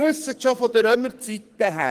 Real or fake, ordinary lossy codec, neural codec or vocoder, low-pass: fake; Opus, 24 kbps; codec, 44.1 kHz, 2.6 kbps, SNAC; 14.4 kHz